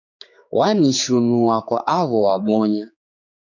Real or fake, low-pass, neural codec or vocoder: fake; 7.2 kHz; codec, 16 kHz, 4 kbps, X-Codec, HuBERT features, trained on general audio